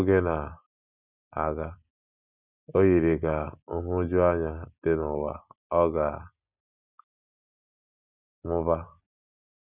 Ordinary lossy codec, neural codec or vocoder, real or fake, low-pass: none; none; real; 3.6 kHz